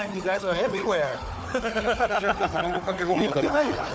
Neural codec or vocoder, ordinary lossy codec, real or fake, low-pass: codec, 16 kHz, 4 kbps, FunCodec, trained on Chinese and English, 50 frames a second; none; fake; none